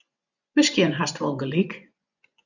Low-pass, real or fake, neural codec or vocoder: 7.2 kHz; fake; vocoder, 24 kHz, 100 mel bands, Vocos